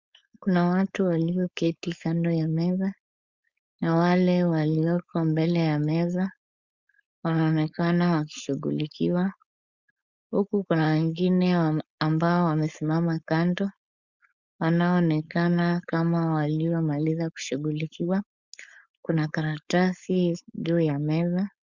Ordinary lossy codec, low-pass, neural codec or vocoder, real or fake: Opus, 64 kbps; 7.2 kHz; codec, 16 kHz, 4.8 kbps, FACodec; fake